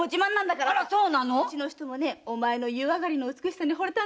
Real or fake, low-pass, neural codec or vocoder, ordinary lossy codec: real; none; none; none